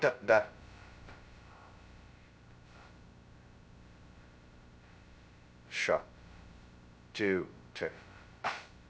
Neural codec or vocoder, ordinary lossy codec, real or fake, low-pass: codec, 16 kHz, 0.2 kbps, FocalCodec; none; fake; none